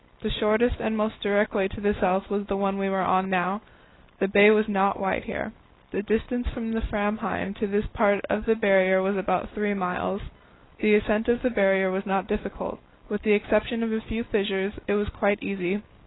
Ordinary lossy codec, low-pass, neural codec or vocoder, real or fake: AAC, 16 kbps; 7.2 kHz; none; real